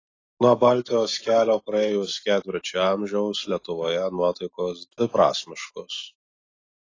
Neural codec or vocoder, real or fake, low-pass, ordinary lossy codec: none; real; 7.2 kHz; AAC, 32 kbps